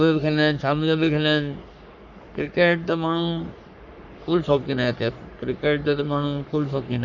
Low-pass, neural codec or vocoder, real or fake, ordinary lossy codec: 7.2 kHz; codec, 44.1 kHz, 3.4 kbps, Pupu-Codec; fake; none